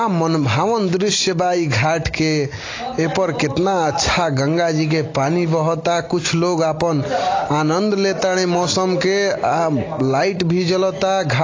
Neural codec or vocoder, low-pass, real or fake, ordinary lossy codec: none; 7.2 kHz; real; AAC, 32 kbps